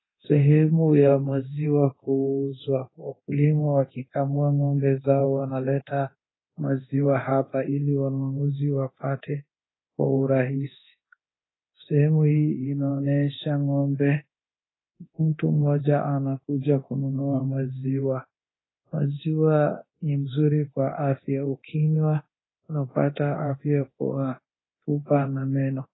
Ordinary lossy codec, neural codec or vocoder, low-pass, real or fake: AAC, 16 kbps; codec, 24 kHz, 0.9 kbps, DualCodec; 7.2 kHz; fake